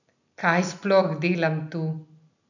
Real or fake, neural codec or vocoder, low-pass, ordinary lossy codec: real; none; 7.2 kHz; none